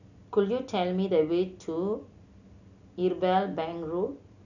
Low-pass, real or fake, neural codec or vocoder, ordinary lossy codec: 7.2 kHz; real; none; none